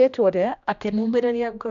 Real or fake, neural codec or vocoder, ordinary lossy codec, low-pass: fake; codec, 16 kHz, 1 kbps, X-Codec, HuBERT features, trained on general audio; none; 7.2 kHz